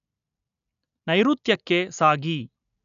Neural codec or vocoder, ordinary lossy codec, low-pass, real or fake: none; none; 7.2 kHz; real